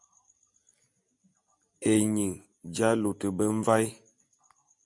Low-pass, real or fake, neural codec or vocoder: 10.8 kHz; real; none